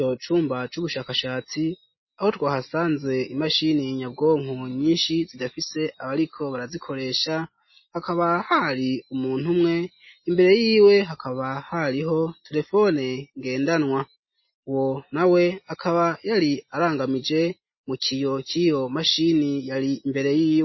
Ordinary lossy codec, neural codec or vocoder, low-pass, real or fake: MP3, 24 kbps; none; 7.2 kHz; real